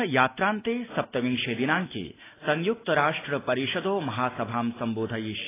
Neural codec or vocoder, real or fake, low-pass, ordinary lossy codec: none; real; 3.6 kHz; AAC, 16 kbps